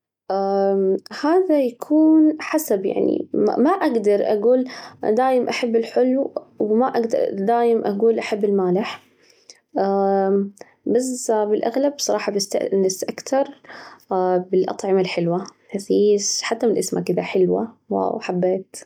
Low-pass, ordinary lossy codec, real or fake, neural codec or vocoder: 19.8 kHz; none; real; none